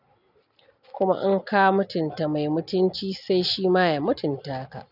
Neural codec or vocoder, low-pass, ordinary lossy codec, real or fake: none; 5.4 kHz; none; real